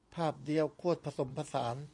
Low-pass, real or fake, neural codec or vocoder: 10.8 kHz; fake; vocoder, 24 kHz, 100 mel bands, Vocos